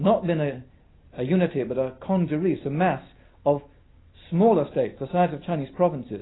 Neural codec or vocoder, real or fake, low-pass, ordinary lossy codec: none; real; 7.2 kHz; AAC, 16 kbps